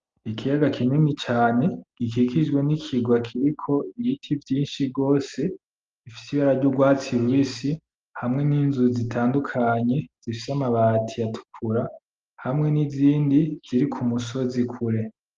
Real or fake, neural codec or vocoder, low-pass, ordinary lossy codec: real; none; 7.2 kHz; Opus, 32 kbps